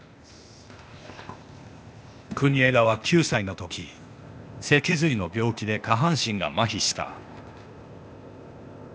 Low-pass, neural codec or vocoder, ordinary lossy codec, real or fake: none; codec, 16 kHz, 0.8 kbps, ZipCodec; none; fake